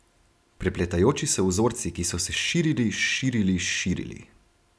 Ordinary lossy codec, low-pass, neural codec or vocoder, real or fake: none; none; none; real